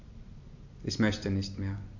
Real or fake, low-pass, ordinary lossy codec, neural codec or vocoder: real; 7.2 kHz; AAC, 48 kbps; none